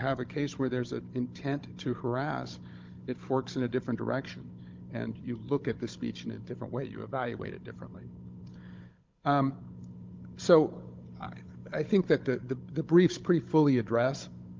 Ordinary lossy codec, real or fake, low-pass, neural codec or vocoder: Opus, 24 kbps; fake; 7.2 kHz; codec, 16 kHz, 16 kbps, FunCodec, trained on Chinese and English, 50 frames a second